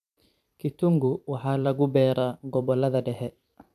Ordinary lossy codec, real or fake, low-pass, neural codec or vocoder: none; fake; 14.4 kHz; vocoder, 44.1 kHz, 128 mel bands every 256 samples, BigVGAN v2